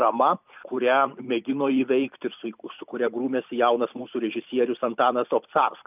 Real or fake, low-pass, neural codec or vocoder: fake; 3.6 kHz; vocoder, 44.1 kHz, 128 mel bands every 256 samples, BigVGAN v2